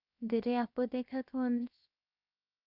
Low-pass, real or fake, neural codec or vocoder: 5.4 kHz; fake; codec, 16 kHz, 0.7 kbps, FocalCodec